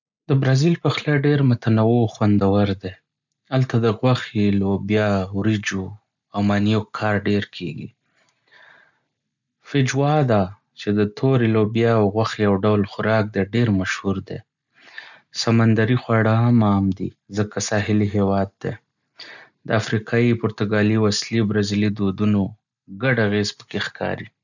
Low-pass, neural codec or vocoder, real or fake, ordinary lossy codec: 7.2 kHz; none; real; none